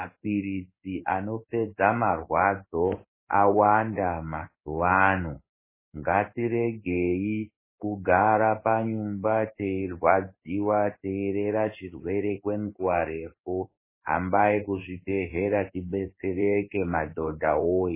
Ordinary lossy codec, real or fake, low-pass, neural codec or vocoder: MP3, 16 kbps; fake; 3.6 kHz; codec, 16 kHz in and 24 kHz out, 1 kbps, XY-Tokenizer